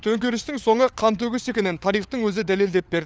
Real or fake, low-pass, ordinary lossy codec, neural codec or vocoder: fake; none; none; codec, 16 kHz, 4 kbps, FunCodec, trained on LibriTTS, 50 frames a second